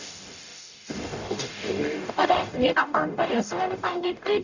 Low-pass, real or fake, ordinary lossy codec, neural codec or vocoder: 7.2 kHz; fake; none; codec, 44.1 kHz, 0.9 kbps, DAC